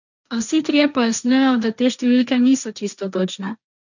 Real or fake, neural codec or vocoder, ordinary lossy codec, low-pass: fake; codec, 16 kHz, 1.1 kbps, Voila-Tokenizer; none; 7.2 kHz